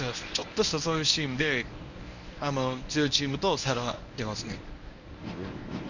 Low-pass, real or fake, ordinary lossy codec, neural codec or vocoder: 7.2 kHz; fake; none; codec, 24 kHz, 0.9 kbps, WavTokenizer, medium speech release version 1